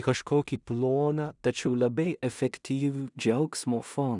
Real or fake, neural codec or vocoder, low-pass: fake; codec, 16 kHz in and 24 kHz out, 0.4 kbps, LongCat-Audio-Codec, two codebook decoder; 10.8 kHz